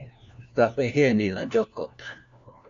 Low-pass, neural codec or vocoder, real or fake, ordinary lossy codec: 7.2 kHz; codec, 16 kHz, 1 kbps, FunCodec, trained on LibriTTS, 50 frames a second; fake; MP3, 64 kbps